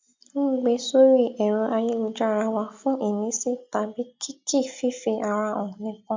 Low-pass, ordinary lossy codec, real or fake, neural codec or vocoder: 7.2 kHz; MP3, 64 kbps; real; none